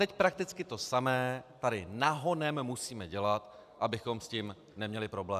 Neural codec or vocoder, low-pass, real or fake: none; 14.4 kHz; real